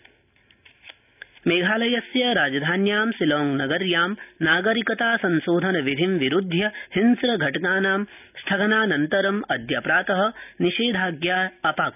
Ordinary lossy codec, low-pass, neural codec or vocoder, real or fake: none; 3.6 kHz; none; real